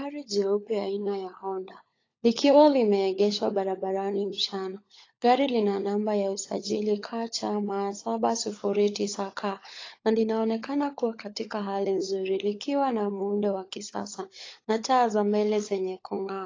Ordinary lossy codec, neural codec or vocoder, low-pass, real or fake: AAC, 32 kbps; codec, 16 kHz, 16 kbps, FunCodec, trained on LibriTTS, 50 frames a second; 7.2 kHz; fake